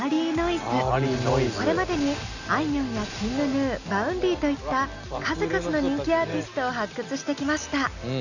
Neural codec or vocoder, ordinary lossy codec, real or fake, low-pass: none; none; real; 7.2 kHz